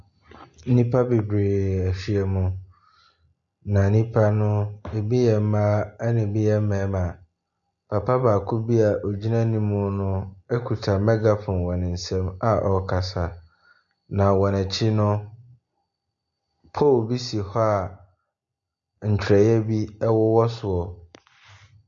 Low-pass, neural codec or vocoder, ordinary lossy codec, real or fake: 7.2 kHz; none; MP3, 48 kbps; real